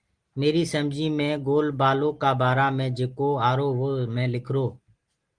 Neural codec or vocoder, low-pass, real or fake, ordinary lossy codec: none; 9.9 kHz; real; Opus, 24 kbps